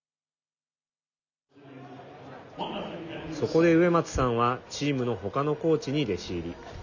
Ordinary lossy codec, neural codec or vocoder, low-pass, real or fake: none; none; 7.2 kHz; real